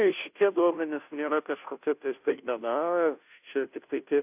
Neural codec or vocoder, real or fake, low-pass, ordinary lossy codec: codec, 16 kHz, 0.5 kbps, FunCodec, trained on Chinese and English, 25 frames a second; fake; 3.6 kHz; AAC, 32 kbps